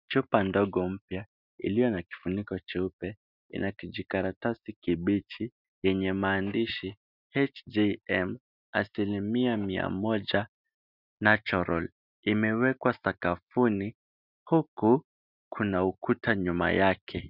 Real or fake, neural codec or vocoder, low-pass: real; none; 5.4 kHz